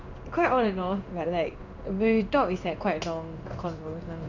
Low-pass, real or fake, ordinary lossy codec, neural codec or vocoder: 7.2 kHz; fake; none; codec, 16 kHz in and 24 kHz out, 1 kbps, XY-Tokenizer